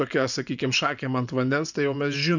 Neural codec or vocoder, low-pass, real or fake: vocoder, 24 kHz, 100 mel bands, Vocos; 7.2 kHz; fake